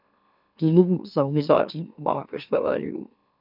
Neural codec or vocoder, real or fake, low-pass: autoencoder, 44.1 kHz, a latent of 192 numbers a frame, MeloTTS; fake; 5.4 kHz